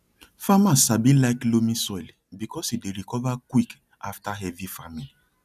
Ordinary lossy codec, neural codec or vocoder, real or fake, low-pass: none; none; real; 14.4 kHz